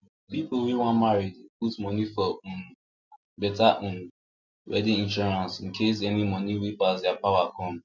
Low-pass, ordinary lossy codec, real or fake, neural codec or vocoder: 7.2 kHz; none; real; none